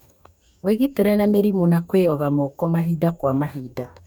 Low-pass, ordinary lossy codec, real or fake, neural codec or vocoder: 19.8 kHz; none; fake; codec, 44.1 kHz, 2.6 kbps, DAC